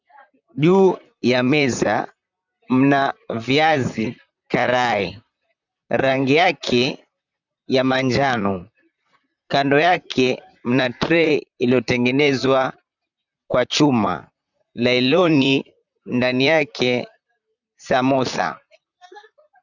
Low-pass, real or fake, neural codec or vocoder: 7.2 kHz; fake; vocoder, 44.1 kHz, 128 mel bands, Pupu-Vocoder